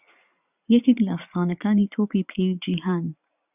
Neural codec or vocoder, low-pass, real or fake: vocoder, 44.1 kHz, 80 mel bands, Vocos; 3.6 kHz; fake